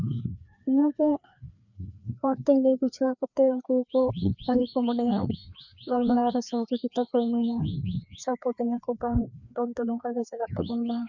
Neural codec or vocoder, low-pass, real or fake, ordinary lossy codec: codec, 16 kHz, 2 kbps, FreqCodec, larger model; 7.2 kHz; fake; none